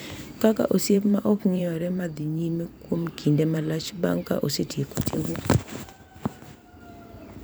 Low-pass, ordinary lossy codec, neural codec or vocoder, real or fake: none; none; vocoder, 44.1 kHz, 128 mel bands every 512 samples, BigVGAN v2; fake